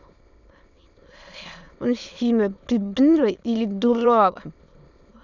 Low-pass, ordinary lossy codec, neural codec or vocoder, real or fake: 7.2 kHz; none; autoencoder, 22.05 kHz, a latent of 192 numbers a frame, VITS, trained on many speakers; fake